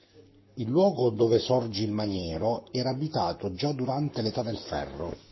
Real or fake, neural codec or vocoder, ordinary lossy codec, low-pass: fake; codec, 44.1 kHz, 7.8 kbps, Pupu-Codec; MP3, 24 kbps; 7.2 kHz